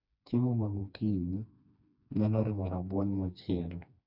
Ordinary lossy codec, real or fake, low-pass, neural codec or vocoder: none; fake; 5.4 kHz; codec, 16 kHz, 2 kbps, FreqCodec, smaller model